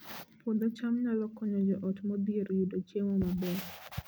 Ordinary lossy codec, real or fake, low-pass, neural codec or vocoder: none; real; none; none